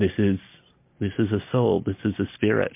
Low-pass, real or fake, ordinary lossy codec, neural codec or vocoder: 3.6 kHz; real; MP3, 24 kbps; none